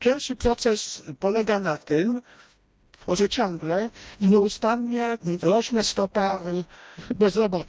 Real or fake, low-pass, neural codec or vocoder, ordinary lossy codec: fake; none; codec, 16 kHz, 1 kbps, FreqCodec, smaller model; none